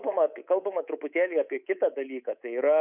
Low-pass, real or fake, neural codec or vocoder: 3.6 kHz; fake; codec, 24 kHz, 3.1 kbps, DualCodec